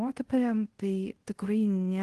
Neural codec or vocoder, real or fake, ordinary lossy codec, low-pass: codec, 24 kHz, 0.5 kbps, DualCodec; fake; Opus, 16 kbps; 10.8 kHz